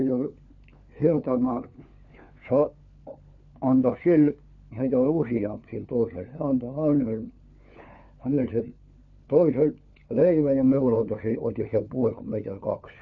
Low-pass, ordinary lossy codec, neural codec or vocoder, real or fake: 7.2 kHz; none; codec, 16 kHz, 4 kbps, FunCodec, trained on LibriTTS, 50 frames a second; fake